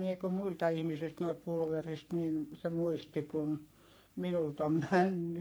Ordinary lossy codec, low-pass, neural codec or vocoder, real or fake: none; none; codec, 44.1 kHz, 3.4 kbps, Pupu-Codec; fake